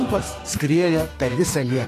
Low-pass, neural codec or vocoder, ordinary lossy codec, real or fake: 14.4 kHz; codec, 32 kHz, 1.9 kbps, SNAC; AAC, 48 kbps; fake